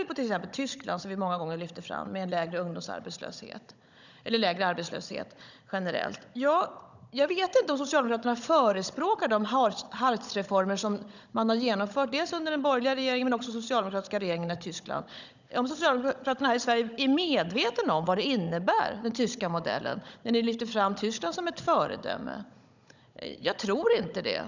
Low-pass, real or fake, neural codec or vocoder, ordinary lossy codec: 7.2 kHz; fake; codec, 16 kHz, 16 kbps, FunCodec, trained on Chinese and English, 50 frames a second; none